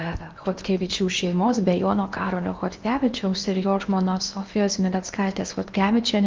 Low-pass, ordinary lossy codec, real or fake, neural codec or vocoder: 7.2 kHz; Opus, 32 kbps; fake; codec, 16 kHz in and 24 kHz out, 0.8 kbps, FocalCodec, streaming, 65536 codes